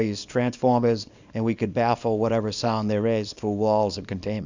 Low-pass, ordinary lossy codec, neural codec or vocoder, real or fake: 7.2 kHz; Opus, 64 kbps; codec, 24 kHz, 0.9 kbps, WavTokenizer, small release; fake